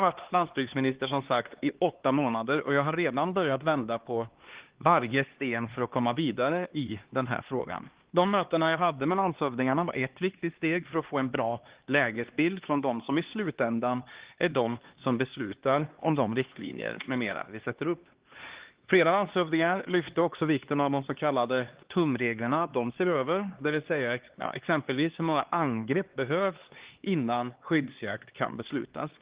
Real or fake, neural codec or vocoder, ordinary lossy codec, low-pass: fake; codec, 16 kHz, 2 kbps, X-Codec, HuBERT features, trained on LibriSpeech; Opus, 16 kbps; 3.6 kHz